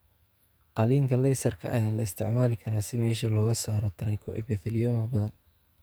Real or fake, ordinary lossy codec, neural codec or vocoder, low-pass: fake; none; codec, 44.1 kHz, 2.6 kbps, SNAC; none